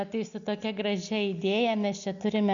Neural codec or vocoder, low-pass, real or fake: none; 7.2 kHz; real